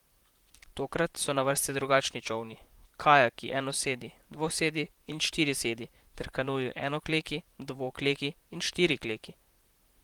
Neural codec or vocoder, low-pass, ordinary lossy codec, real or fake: vocoder, 44.1 kHz, 128 mel bands, Pupu-Vocoder; 19.8 kHz; Opus, 24 kbps; fake